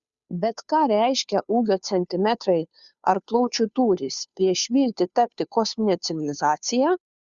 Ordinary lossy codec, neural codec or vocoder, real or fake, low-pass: Opus, 64 kbps; codec, 16 kHz, 2 kbps, FunCodec, trained on Chinese and English, 25 frames a second; fake; 7.2 kHz